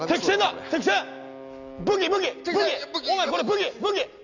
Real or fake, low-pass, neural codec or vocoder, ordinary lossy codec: real; 7.2 kHz; none; none